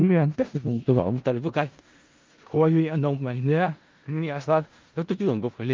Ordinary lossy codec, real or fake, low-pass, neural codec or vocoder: Opus, 32 kbps; fake; 7.2 kHz; codec, 16 kHz in and 24 kHz out, 0.4 kbps, LongCat-Audio-Codec, four codebook decoder